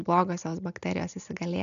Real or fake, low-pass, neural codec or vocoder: real; 7.2 kHz; none